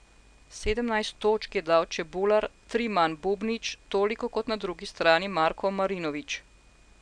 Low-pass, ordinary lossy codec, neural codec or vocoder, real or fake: 9.9 kHz; none; none; real